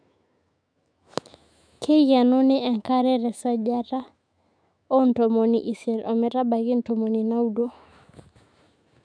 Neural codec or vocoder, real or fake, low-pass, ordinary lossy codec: autoencoder, 48 kHz, 128 numbers a frame, DAC-VAE, trained on Japanese speech; fake; 9.9 kHz; none